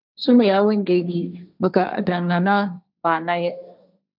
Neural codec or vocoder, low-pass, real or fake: codec, 16 kHz, 1.1 kbps, Voila-Tokenizer; 5.4 kHz; fake